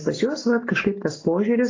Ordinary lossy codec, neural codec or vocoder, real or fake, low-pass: AAC, 32 kbps; none; real; 7.2 kHz